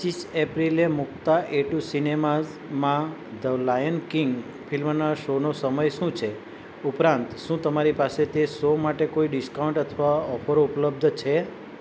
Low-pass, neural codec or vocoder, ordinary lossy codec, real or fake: none; none; none; real